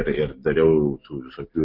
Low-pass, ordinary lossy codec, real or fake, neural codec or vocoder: 5.4 kHz; AAC, 48 kbps; real; none